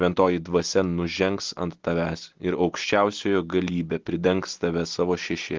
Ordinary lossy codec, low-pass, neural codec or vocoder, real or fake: Opus, 16 kbps; 7.2 kHz; none; real